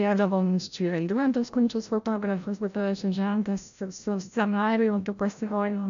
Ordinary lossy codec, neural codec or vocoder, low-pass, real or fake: AAC, 96 kbps; codec, 16 kHz, 0.5 kbps, FreqCodec, larger model; 7.2 kHz; fake